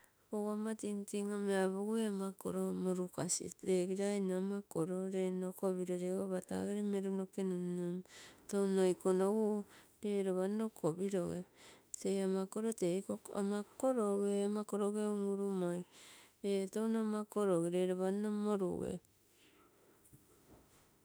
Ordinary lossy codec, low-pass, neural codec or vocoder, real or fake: none; none; autoencoder, 48 kHz, 32 numbers a frame, DAC-VAE, trained on Japanese speech; fake